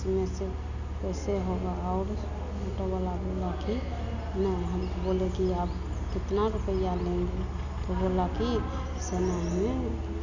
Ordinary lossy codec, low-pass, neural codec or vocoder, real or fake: none; 7.2 kHz; none; real